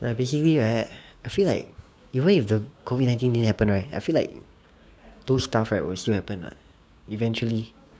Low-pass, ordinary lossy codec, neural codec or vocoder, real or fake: none; none; codec, 16 kHz, 6 kbps, DAC; fake